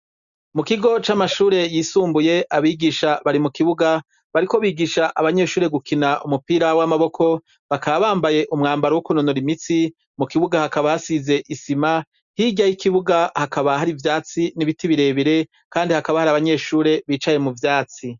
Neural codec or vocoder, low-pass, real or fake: none; 7.2 kHz; real